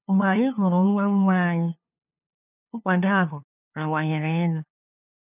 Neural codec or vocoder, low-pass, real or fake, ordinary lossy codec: codec, 16 kHz, 2 kbps, FunCodec, trained on LibriTTS, 25 frames a second; 3.6 kHz; fake; none